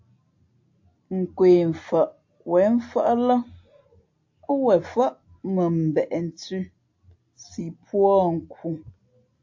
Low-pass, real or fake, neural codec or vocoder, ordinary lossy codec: 7.2 kHz; real; none; AAC, 48 kbps